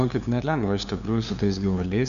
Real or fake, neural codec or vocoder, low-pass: fake; codec, 16 kHz, 2 kbps, FunCodec, trained on LibriTTS, 25 frames a second; 7.2 kHz